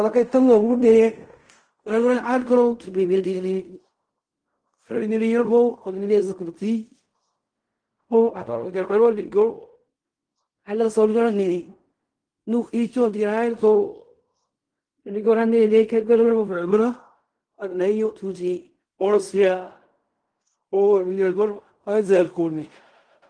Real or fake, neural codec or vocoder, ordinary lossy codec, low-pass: fake; codec, 16 kHz in and 24 kHz out, 0.4 kbps, LongCat-Audio-Codec, fine tuned four codebook decoder; Opus, 24 kbps; 9.9 kHz